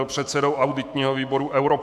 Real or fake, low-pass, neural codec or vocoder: fake; 14.4 kHz; autoencoder, 48 kHz, 128 numbers a frame, DAC-VAE, trained on Japanese speech